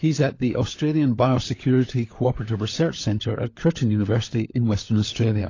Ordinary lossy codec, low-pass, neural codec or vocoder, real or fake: AAC, 32 kbps; 7.2 kHz; codec, 16 kHz, 16 kbps, FunCodec, trained on LibriTTS, 50 frames a second; fake